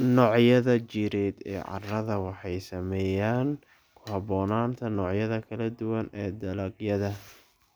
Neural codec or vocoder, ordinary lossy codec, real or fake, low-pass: none; none; real; none